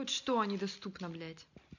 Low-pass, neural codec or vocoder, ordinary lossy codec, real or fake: 7.2 kHz; none; none; real